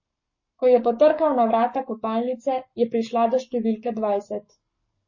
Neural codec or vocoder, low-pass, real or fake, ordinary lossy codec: codec, 44.1 kHz, 7.8 kbps, Pupu-Codec; 7.2 kHz; fake; MP3, 32 kbps